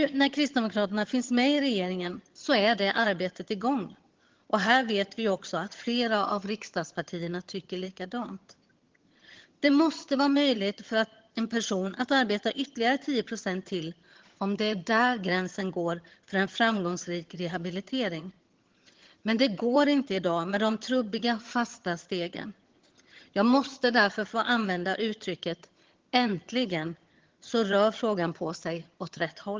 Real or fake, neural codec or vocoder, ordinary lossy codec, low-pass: fake; vocoder, 22.05 kHz, 80 mel bands, HiFi-GAN; Opus, 16 kbps; 7.2 kHz